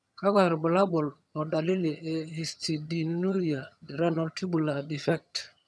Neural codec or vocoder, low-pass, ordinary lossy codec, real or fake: vocoder, 22.05 kHz, 80 mel bands, HiFi-GAN; none; none; fake